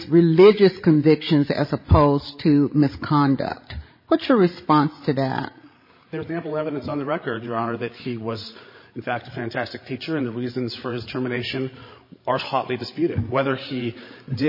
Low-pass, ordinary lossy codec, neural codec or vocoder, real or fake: 5.4 kHz; MP3, 24 kbps; codec, 16 kHz, 16 kbps, FreqCodec, larger model; fake